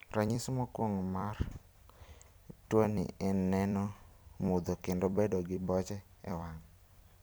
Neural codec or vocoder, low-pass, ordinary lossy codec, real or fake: vocoder, 44.1 kHz, 128 mel bands every 256 samples, BigVGAN v2; none; none; fake